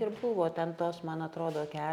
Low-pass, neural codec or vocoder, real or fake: 19.8 kHz; none; real